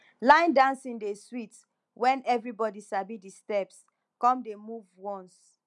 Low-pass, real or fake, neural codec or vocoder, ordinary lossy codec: 10.8 kHz; real; none; none